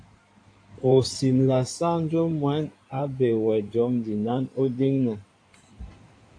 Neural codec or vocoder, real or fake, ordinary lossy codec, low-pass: codec, 16 kHz in and 24 kHz out, 2.2 kbps, FireRedTTS-2 codec; fake; AAC, 48 kbps; 9.9 kHz